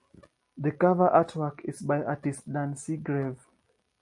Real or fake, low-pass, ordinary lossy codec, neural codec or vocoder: real; 10.8 kHz; MP3, 48 kbps; none